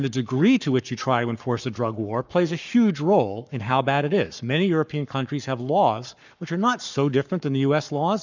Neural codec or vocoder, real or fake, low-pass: codec, 44.1 kHz, 7.8 kbps, Pupu-Codec; fake; 7.2 kHz